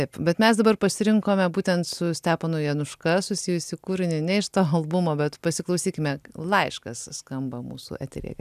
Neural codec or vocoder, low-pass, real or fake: none; 14.4 kHz; real